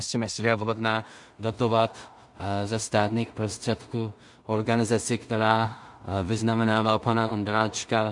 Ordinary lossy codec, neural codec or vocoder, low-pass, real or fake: MP3, 64 kbps; codec, 16 kHz in and 24 kHz out, 0.4 kbps, LongCat-Audio-Codec, two codebook decoder; 10.8 kHz; fake